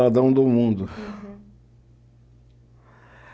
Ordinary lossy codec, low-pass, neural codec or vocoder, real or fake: none; none; none; real